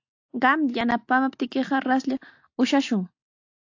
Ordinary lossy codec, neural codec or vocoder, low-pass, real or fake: AAC, 48 kbps; none; 7.2 kHz; real